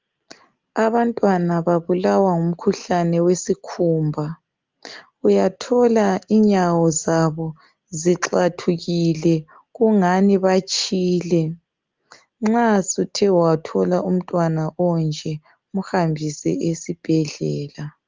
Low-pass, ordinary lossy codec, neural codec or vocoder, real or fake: 7.2 kHz; Opus, 32 kbps; none; real